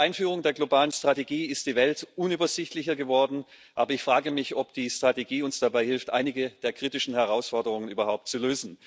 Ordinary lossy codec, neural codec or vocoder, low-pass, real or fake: none; none; none; real